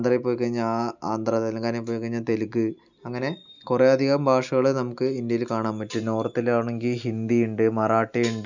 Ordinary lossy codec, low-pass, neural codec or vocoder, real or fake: none; 7.2 kHz; none; real